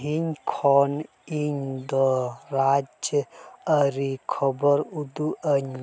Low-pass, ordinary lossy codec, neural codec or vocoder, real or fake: none; none; none; real